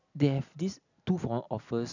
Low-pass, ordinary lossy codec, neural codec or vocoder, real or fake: 7.2 kHz; none; none; real